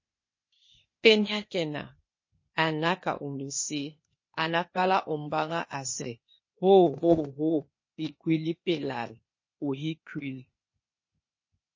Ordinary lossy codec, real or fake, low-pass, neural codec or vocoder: MP3, 32 kbps; fake; 7.2 kHz; codec, 16 kHz, 0.8 kbps, ZipCodec